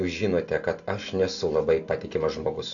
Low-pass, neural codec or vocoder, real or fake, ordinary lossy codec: 7.2 kHz; none; real; AAC, 64 kbps